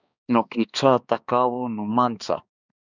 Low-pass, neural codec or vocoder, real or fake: 7.2 kHz; codec, 16 kHz, 2 kbps, X-Codec, HuBERT features, trained on balanced general audio; fake